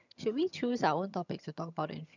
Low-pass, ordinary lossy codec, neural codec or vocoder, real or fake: 7.2 kHz; none; vocoder, 22.05 kHz, 80 mel bands, HiFi-GAN; fake